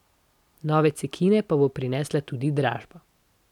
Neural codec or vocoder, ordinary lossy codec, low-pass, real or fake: none; none; 19.8 kHz; real